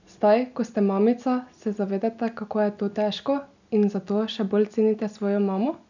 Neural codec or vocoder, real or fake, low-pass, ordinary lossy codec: none; real; 7.2 kHz; none